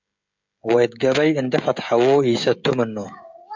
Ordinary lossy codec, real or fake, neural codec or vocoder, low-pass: MP3, 64 kbps; fake; codec, 16 kHz, 16 kbps, FreqCodec, smaller model; 7.2 kHz